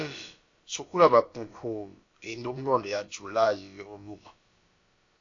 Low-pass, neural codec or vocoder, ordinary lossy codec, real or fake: 7.2 kHz; codec, 16 kHz, about 1 kbps, DyCAST, with the encoder's durations; AAC, 48 kbps; fake